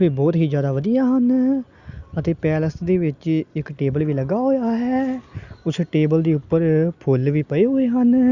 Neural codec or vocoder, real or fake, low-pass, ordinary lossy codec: none; real; 7.2 kHz; Opus, 64 kbps